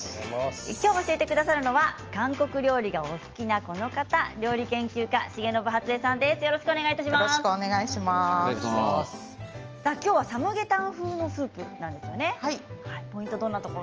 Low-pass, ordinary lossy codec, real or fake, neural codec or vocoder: 7.2 kHz; Opus, 24 kbps; real; none